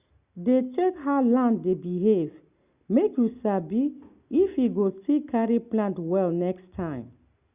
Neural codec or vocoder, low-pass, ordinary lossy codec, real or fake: none; 3.6 kHz; Opus, 64 kbps; real